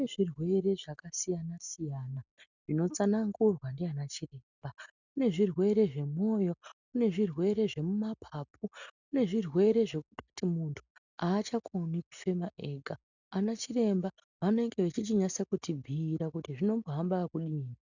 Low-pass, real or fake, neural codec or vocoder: 7.2 kHz; real; none